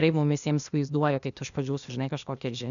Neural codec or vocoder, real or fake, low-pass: codec, 16 kHz, 0.8 kbps, ZipCodec; fake; 7.2 kHz